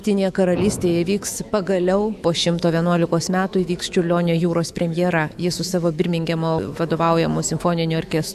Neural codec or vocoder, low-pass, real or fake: autoencoder, 48 kHz, 128 numbers a frame, DAC-VAE, trained on Japanese speech; 14.4 kHz; fake